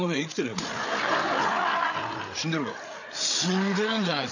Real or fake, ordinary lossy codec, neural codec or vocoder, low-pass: fake; none; codec, 16 kHz, 8 kbps, FreqCodec, larger model; 7.2 kHz